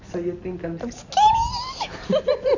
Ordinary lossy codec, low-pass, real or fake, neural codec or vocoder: none; 7.2 kHz; real; none